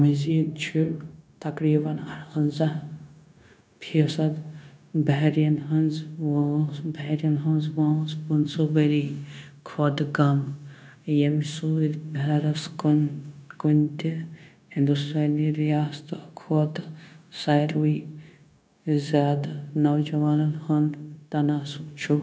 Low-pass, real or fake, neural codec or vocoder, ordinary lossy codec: none; fake; codec, 16 kHz, 0.9 kbps, LongCat-Audio-Codec; none